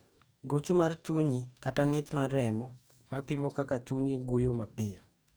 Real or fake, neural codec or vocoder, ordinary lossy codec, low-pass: fake; codec, 44.1 kHz, 2.6 kbps, DAC; none; none